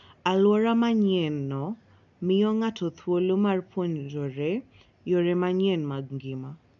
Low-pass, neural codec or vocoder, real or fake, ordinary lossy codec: 7.2 kHz; none; real; none